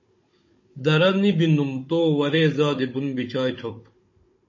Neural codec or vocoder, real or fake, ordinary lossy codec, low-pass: codec, 16 kHz, 16 kbps, FunCodec, trained on Chinese and English, 50 frames a second; fake; MP3, 32 kbps; 7.2 kHz